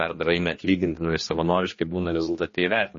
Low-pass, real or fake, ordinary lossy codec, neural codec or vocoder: 7.2 kHz; fake; MP3, 32 kbps; codec, 16 kHz, 2 kbps, X-Codec, HuBERT features, trained on general audio